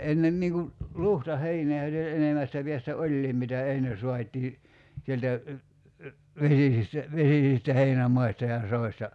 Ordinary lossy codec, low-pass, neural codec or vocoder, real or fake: none; none; none; real